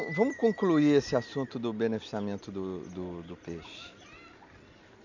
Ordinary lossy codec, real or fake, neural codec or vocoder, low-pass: none; real; none; 7.2 kHz